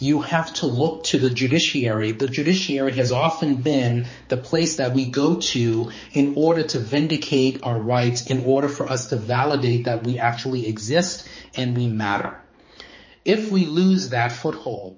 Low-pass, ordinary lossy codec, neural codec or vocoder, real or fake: 7.2 kHz; MP3, 32 kbps; codec, 16 kHz, 4 kbps, X-Codec, HuBERT features, trained on balanced general audio; fake